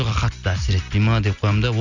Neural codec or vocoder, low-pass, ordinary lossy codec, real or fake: none; 7.2 kHz; none; real